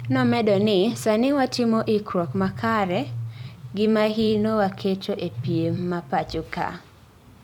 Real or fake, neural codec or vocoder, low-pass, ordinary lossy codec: fake; vocoder, 44.1 kHz, 128 mel bands every 256 samples, BigVGAN v2; 19.8 kHz; MP3, 96 kbps